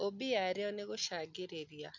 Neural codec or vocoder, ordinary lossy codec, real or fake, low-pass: none; MP3, 64 kbps; real; 7.2 kHz